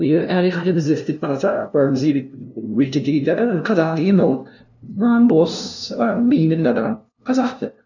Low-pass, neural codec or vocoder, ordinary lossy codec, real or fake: 7.2 kHz; codec, 16 kHz, 0.5 kbps, FunCodec, trained on LibriTTS, 25 frames a second; none; fake